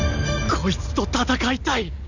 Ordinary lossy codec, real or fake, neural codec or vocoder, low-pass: none; real; none; 7.2 kHz